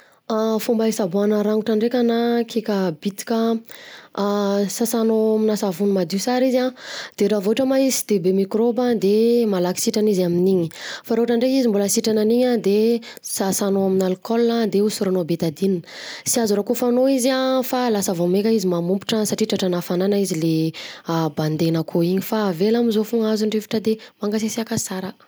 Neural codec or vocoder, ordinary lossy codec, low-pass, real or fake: none; none; none; real